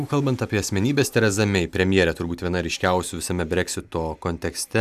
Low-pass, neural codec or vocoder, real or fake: 14.4 kHz; none; real